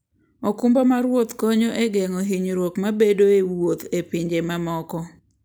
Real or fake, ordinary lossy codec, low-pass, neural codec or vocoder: real; none; none; none